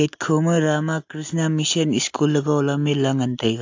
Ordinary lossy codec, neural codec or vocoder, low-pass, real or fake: AAC, 48 kbps; none; 7.2 kHz; real